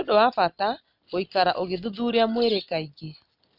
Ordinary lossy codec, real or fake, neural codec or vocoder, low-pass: none; real; none; 5.4 kHz